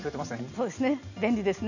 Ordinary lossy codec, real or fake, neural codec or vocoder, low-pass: none; real; none; 7.2 kHz